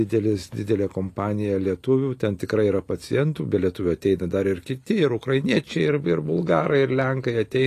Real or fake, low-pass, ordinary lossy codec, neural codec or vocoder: real; 14.4 kHz; AAC, 48 kbps; none